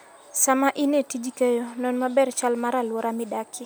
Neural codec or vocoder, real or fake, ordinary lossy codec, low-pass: none; real; none; none